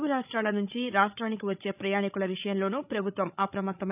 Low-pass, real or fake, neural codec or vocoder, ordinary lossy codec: 3.6 kHz; fake; codec, 16 kHz, 16 kbps, FreqCodec, larger model; none